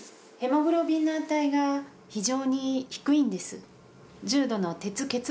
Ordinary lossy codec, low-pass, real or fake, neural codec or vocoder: none; none; real; none